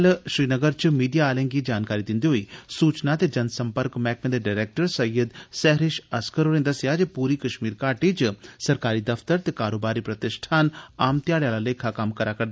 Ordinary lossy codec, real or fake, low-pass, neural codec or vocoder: none; real; none; none